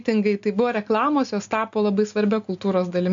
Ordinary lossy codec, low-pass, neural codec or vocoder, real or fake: AAC, 64 kbps; 7.2 kHz; none; real